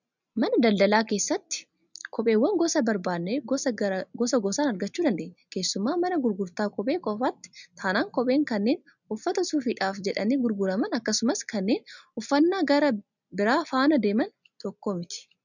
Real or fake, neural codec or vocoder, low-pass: real; none; 7.2 kHz